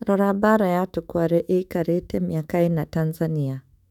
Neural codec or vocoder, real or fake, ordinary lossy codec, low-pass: autoencoder, 48 kHz, 128 numbers a frame, DAC-VAE, trained on Japanese speech; fake; none; 19.8 kHz